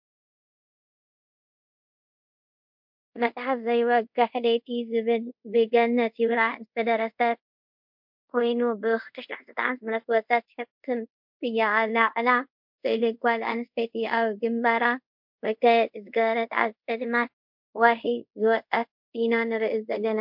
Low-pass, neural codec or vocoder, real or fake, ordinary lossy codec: 5.4 kHz; codec, 24 kHz, 0.5 kbps, DualCodec; fake; MP3, 48 kbps